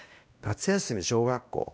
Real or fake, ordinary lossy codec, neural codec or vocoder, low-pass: fake; none; codec, 16 kHz, 1 kbps, X-Codec, WavLM features, trained on Multilingual LibriSpeech; none